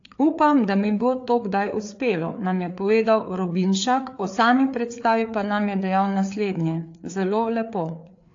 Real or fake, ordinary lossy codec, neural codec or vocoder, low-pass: fake; AAC, 48 kbps; codec, 16 kHz, 4 kbps, FreqCodec, larger model; 7.2 kHz